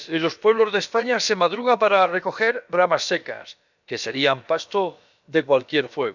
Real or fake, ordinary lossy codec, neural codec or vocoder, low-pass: fake; none; codec, 16 kHz, about 1 kbps, DyCAST, with the encoder's durations; 7.2 kHz